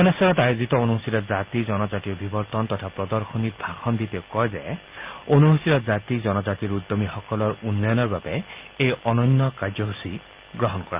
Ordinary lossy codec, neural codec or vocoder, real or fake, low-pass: Opus, 24 kbps; none; real; 3.6 kHz